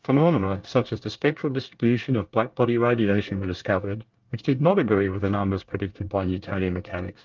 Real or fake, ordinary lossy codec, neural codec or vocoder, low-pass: fake; Opus, 32 kbps; codec, 24 kHz, 1 kbps, SNAC; 7.2 kHz